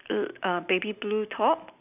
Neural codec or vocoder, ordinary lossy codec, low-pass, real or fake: none; none; 3.6 kHz; real